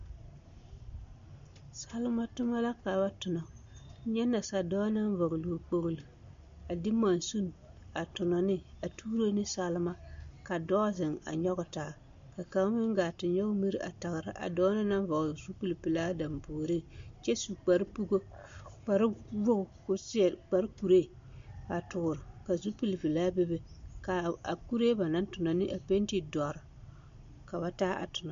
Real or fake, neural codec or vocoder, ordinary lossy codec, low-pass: real; none; MP3, 48 kbps; 7.2 kHz